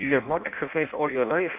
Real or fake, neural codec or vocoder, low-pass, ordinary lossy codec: fake; codec, 16 kHz in and 24 kHz out, 0.6 kbps, FireRedTTS-2 codec; 3.6 kHz; none